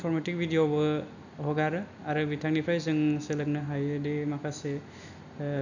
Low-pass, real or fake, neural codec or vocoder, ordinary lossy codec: 7.2 kHz; real; none; none